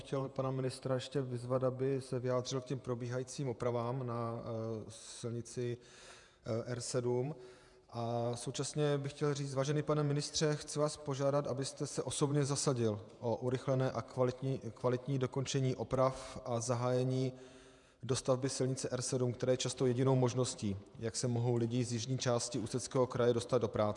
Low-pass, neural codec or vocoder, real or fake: 10.8 kHz; vocoder, 48 kHz, 128 mel bands, Vocos; fake